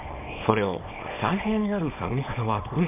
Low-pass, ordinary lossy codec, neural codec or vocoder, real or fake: 3.6 kHz; MP3, 32 kbps; codec, 24 kHz, 0.9 kbps, WavTokenizer, small release; fake